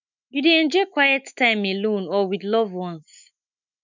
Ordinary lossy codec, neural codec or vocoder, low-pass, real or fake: none; autoencoder, 48 kHz, 128 numbers a frame, DAC-VAE, trained on Japanese speech; 7.2 kHz; fake